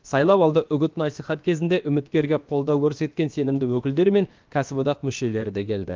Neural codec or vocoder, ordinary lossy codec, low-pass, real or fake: codec, 16 kHz, about 1 kbps, DyCAST, with the encoder's durations; Opus, 32 kbps; 7.2 kHz; fake